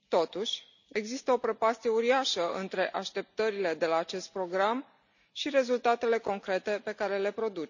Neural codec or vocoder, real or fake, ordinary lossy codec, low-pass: none; real; none; 7.2 kHz